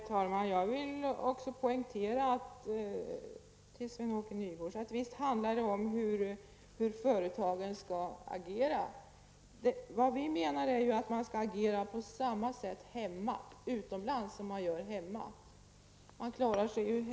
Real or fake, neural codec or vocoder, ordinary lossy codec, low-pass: real; none; none; none